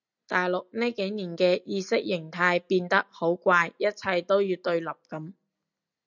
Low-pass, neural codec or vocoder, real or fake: 7.2 kHz; none; real